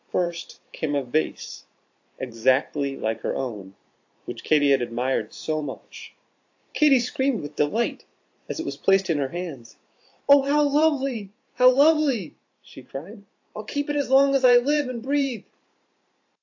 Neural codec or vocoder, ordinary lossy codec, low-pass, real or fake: vocoder, 44.1 kHz, 128 mel bands every 512 samples, BigVGAN v2; AAC, 48 kbps; 7.2 kHz; fake